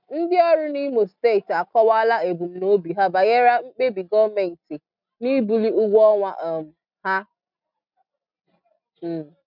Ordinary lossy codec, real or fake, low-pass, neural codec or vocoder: none; real; 5.4 kHz; none